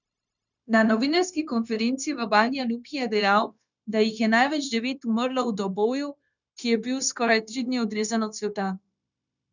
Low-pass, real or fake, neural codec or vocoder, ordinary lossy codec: 7.2 kHz; fake; codec, 16 kHz, 0.9 kbps, LongCat-Audio-Codec; none